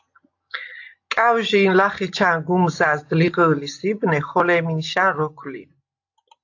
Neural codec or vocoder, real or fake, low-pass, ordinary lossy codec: none; real; 7.2 kHz; AAC, 48 kbps